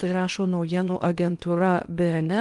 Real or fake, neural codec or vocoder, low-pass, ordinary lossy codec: fake; codec, 16 kHz in and 24 kHz out, 0.8 kbps, FocalCodec, streaming, 65536 codes; 10.8 kHz; Opus, 32 kbps